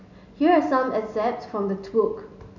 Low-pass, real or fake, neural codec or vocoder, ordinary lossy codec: 7.2 kHz; real; none; none